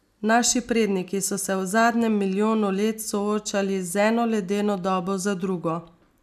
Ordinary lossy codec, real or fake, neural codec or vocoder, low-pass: none; real; none; 14.4 kHz